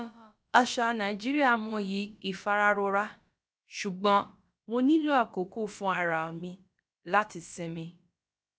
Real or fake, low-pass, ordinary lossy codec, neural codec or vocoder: fake; none; none; codec, 16 kHz, about 1 kbps, DyCAST, with the encoder's durations